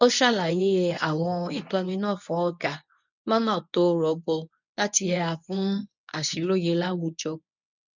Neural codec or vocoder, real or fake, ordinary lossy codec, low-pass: codec, 24 kHz, 0.9 kbps, WavTokenizer, medium speech release version 1; fake; none; 7.2 kHz